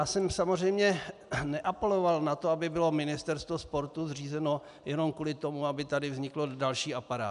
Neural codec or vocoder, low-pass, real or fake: none; 10.8 kHz; real